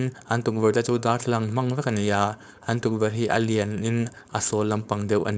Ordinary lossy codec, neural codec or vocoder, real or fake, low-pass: none; codec, 16 kHz, 4.8 kbps, FACodec; fake; none